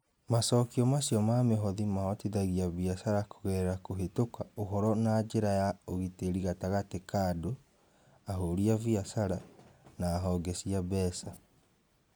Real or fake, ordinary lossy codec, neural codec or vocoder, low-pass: real; none; none; none